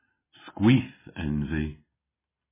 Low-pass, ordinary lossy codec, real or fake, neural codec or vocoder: 3.6 kHz; MP3, 16 kbps; real; none